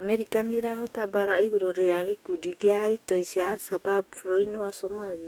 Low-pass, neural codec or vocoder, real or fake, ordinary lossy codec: 19.8 kHz; codec, 44.1 kHz, 2.6 kbps, DAC; fake; none